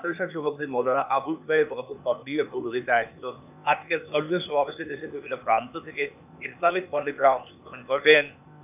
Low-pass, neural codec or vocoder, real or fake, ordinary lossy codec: 3.6 kHz; codec, 16 kHz, 0.8 kbps, ZipCodec; fake; none